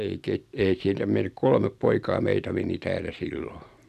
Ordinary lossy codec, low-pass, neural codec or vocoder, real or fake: none; 14.4 kHz; none; real